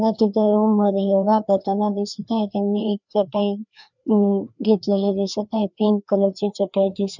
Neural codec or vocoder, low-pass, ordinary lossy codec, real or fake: codec, 16 kHz, 2 kbps, FreqCodec, larger model; 7.2 kHz; none; fake